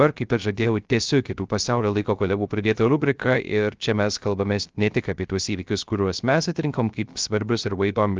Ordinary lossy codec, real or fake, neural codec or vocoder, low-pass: Opus, 32 kbps; fake; codec, 16 kHz, 0.3 kbps, FocalCodec; 7.2 kHz